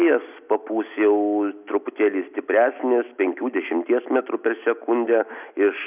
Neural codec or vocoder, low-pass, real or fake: none; 3.6 kHz; real